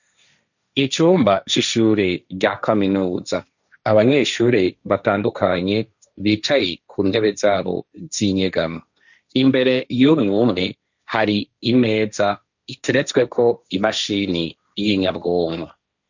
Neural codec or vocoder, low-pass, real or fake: codec, 16 kHz, 1.1 kbps, Voila-Tokenizer; 7.2 kHz; fake